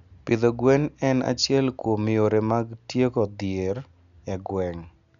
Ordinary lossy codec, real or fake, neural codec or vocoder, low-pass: none; real; none; 7.2 kHz